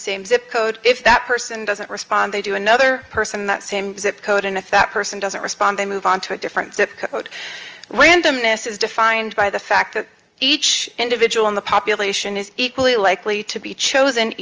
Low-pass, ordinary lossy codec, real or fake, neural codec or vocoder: 7.2 kHz; Opus, 24 kbps; real; none